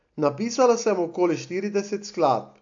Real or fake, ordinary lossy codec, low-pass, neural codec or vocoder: real; AAC, 64 kbps; 7.2 kHz; none